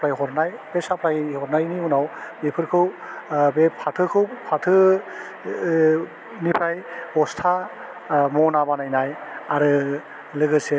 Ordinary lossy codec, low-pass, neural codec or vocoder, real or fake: none; none; none; real